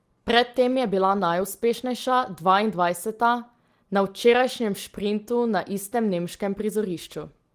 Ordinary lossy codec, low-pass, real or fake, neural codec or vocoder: Opus, 24 kbps; 14.4 kHz; real; none